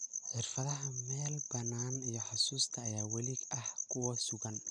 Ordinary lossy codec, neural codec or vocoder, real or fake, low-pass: none; none; real; 9.9 kHz